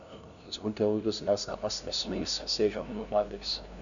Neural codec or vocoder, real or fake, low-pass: codec, 16 kHz, 0.5 kbps, FunCodec, trained on LibriTTS, 25 frames a second; fake; 7.2 kHz